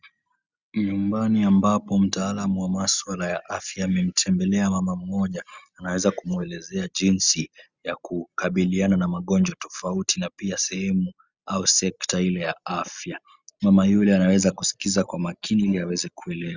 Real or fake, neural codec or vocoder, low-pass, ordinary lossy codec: real; none; 7.2 kHz; Opus, 64 kbps